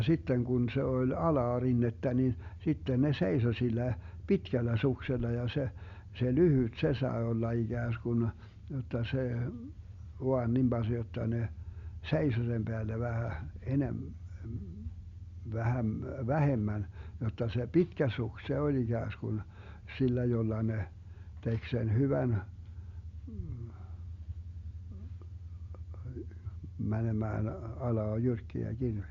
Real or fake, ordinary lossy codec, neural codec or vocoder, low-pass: real; Opus, 64 kbps; none; 7.2 kHz